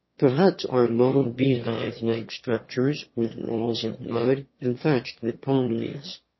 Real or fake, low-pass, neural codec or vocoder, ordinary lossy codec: fake; 7.2 kHz; autoencoder, 22.05 kHz, a latent of 192 numbers a frame, VITS, trained on one speaker; MP3, 24 kbps